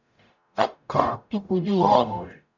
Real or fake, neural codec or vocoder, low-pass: fake; codec, 44.1 kHz, 0.9 kbps, DAC; 7.2 kHz